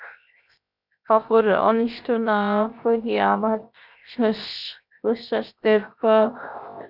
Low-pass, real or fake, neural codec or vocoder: 5.4 kHz; fake; codec, 16 kHz, 0.7 kbps, FocalCodec